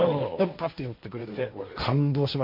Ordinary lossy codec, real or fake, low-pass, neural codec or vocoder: MP3, 48 kbps; fake; 5.4 kHz; codec, 16 kHz, 1.1 kbps, Voila-Tokenizer